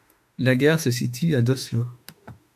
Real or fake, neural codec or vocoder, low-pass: fake; autoencoder, 48 kHz, 32 numbers a frame, DAC-VAE, trained on Japanese speech; 14.4 kHz